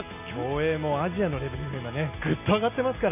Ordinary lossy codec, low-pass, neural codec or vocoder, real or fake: none; 3.6 kHz; none; real